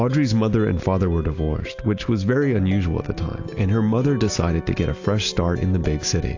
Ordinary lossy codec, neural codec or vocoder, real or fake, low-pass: AAC, 48 kbps; none; real; 7.2 kHz